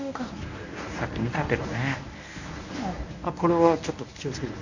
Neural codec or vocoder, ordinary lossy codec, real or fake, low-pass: codec, 24 kHz, 0.9 kbps, WavTokenizer, medium speech release version 1; none; fake; 7.2 kHz